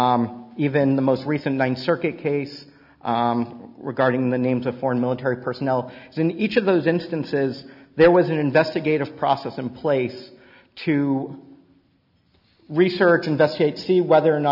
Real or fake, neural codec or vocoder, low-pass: real; none; 5.4 kHz